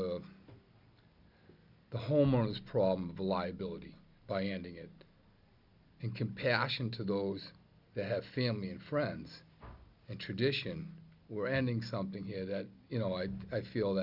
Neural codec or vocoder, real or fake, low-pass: none; real; 5.4 kHz